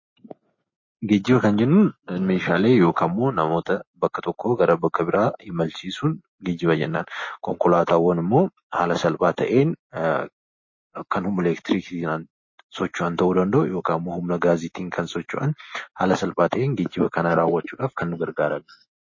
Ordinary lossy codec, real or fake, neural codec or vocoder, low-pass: MP3, 32 kbps; real; none; 7.2 kHz